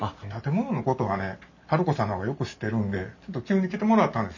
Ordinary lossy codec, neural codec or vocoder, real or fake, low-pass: none; none; real; 7.2 kHz